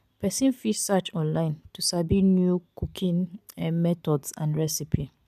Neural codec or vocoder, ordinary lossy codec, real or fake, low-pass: none; MP3, 96 kbps; real; 14.4 kHz